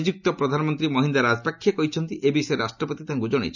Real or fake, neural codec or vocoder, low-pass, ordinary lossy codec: real; none; 7.2 kHz; none